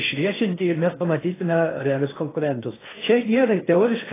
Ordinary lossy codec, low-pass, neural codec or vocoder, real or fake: AAC, 16 kbps; 3.6 kHz; codec, 16 kHz in and 24 kHz out, 0.6 kbps, FocalCodec, streaming, 4096 codes; fake